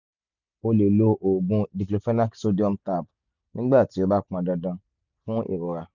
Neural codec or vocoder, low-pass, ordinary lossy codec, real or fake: none; 7.2 kHz; none; real